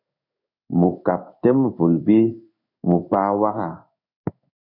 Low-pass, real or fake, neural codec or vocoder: 5.4 kHz; fake; codec, 16 kHz in and 24 kHz out, 1 kbps, XY-Tokenizer